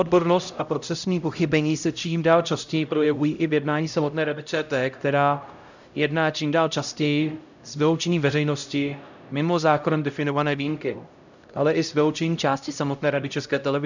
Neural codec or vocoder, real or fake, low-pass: codec, 16 kHz, 0.5 kbps, X-Codec, HuBERT features, trained on LibriSpeech; fake; 7.2 kHz